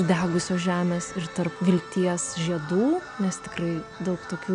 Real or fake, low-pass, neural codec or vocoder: real; 9.9 kHz; none